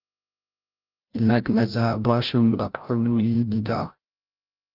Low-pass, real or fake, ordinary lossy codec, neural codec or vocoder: 5.4 kHz; fake; Opus, 32 kbps; codec, 16 kHz, 0.5 kbps, FreqCodec, larger model